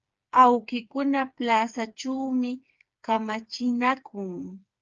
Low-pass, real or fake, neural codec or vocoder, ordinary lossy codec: 7.2 kHz; fake; codec, 16 kHz, 4 kbps, FreqCodec, smaller model; Opus, 24 kbps